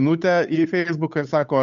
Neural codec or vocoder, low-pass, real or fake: codec, 16 kHz, 8 kbps, FunCodec, trained on Chinese and English, 25 frames a second; 7.2 kHz; fake